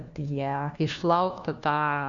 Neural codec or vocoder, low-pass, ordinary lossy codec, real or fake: codec, 16 kHz, 1 kbps, FunCodec, trained on LibriTTS, 50 frames a second; 7.2 kHz; Opus, 64 kbps; fake